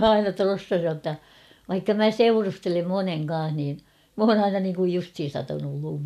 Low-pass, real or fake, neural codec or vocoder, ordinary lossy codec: 14.4 kHz; real; none; none